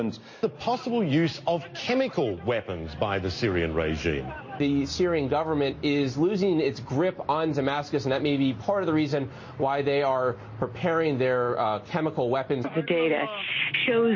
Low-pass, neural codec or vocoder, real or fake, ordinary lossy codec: 7.2 kHz; none; real; MP3, 32 kbps